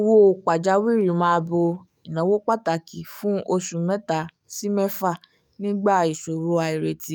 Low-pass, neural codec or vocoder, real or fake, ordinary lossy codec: 19.8 kHz; codec, 44.1 kHz, 7.8 kbps, DAC; fake; none